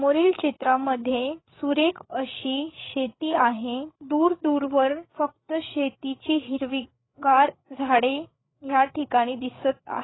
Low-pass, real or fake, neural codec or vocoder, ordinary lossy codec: 7.2 kHz; fake; codec, 16 kHz, 8 kbps, FreqCodec, larger model; AAC, 16 kbps